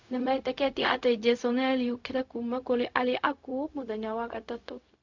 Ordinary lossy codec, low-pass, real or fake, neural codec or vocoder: MP3, 64 kbps; 7.2 kHz; fake; codec, 16 kHz, 0.4 kbps, LongCat-Audio-Codec